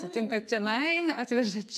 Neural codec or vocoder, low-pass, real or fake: codec, 44.1 kHz, 2.6 kbps, SNAC; 14.4 kHz; fake